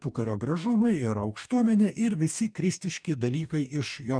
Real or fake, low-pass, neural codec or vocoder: fake; 9.9 kHz; codec, 44.1 kHz, 2.6 kbps, DAC